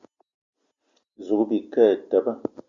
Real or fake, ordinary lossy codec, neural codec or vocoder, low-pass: real; Opus, 64 kbps; none; 7.2 kHz